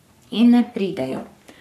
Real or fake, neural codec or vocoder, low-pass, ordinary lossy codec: fake; codec, 44.1 kHz, 3.4 kbps, Pupu-Codec; 14.4 kHz; none